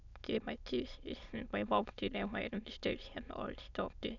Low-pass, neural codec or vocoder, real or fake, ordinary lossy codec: 7.2 kHz; autoencoder, 22.05 kHz, a latent of 192 numbers a frame, VITS, trained on many speakers; fake; none